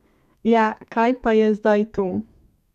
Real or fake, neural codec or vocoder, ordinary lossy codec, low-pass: fake; codec, 32 kHz, 1.9 kbps, SNAC; none; 14.4 kHz